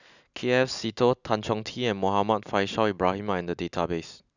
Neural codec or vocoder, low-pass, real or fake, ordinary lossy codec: none; 7.2 kHz; real; none